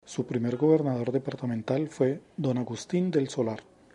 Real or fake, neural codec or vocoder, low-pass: real; none; 10.8 kHz